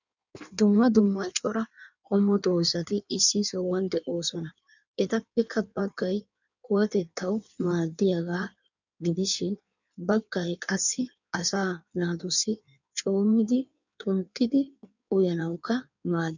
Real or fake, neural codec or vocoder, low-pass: fake; codec, 16 kHz in and 24 kHz out, 1.1 kbps, FireRedTTS-2 codec; 7.2 kHz